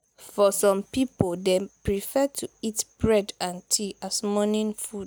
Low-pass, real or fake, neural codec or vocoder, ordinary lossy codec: none; real; none; none